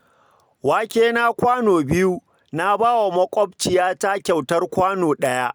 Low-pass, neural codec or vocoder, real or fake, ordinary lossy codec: none; none; real; none